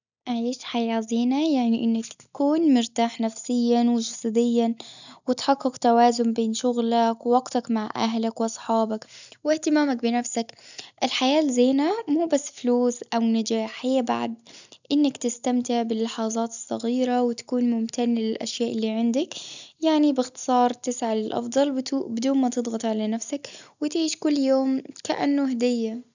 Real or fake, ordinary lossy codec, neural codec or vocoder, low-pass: real; none; none; 7.2 kHz